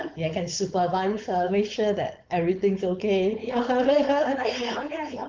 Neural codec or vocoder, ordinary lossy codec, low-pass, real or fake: codec, 16 kHz, 4.8 kbps, FACodec; Opus, 32 kbps; 7.2 kHz; fake